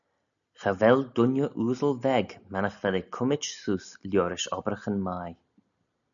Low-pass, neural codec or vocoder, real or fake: 7.2 kHz; none; real